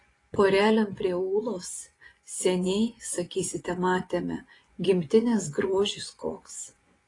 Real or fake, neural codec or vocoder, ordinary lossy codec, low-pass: fake; vocoder, 24 kHz, 100 mel bands, Vocos; AAC, 32 kbps; 10.8 kHz